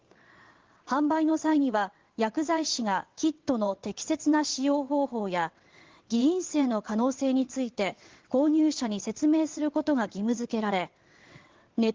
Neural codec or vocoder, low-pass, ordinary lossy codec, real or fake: vocoder, 22.05 kHz, 80 mel bands, WaveNeXt; 7.2 kHz; Opus, 16 kbps; fake